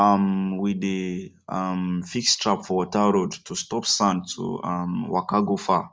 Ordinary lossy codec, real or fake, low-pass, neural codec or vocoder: none; real; none; none